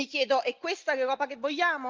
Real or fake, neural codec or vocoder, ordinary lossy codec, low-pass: real; none; Opus, 24 kbps; 7.2 kHz